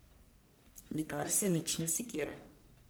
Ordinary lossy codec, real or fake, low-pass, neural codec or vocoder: none; fake; none; codec, 44.1 kHz, 1.7 kbps, Pupu-Codec